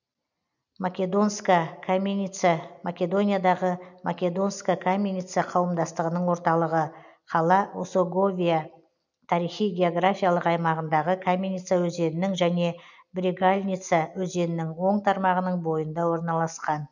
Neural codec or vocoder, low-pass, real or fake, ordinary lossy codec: none; 7.2 kHz; real; none